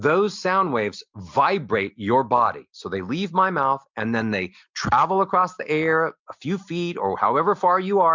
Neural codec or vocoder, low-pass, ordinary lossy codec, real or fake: none; 7.2 kHz; MP3, 64 kbps; real